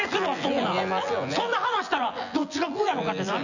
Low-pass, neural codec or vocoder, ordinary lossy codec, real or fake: 7.2 kHz; vocoder, 24 kHz, 100 mel bands, Vocos; none; fake